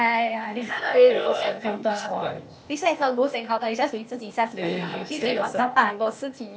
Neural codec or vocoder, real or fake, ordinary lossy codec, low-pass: codec, 16 kHz, 0.8 kbps, ZipCodec; fake; none; none